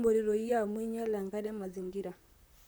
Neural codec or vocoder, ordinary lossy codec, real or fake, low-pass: vocoder, 44.1 kHz, 128 mel bands, Pupu-Vocoder; none; fake; none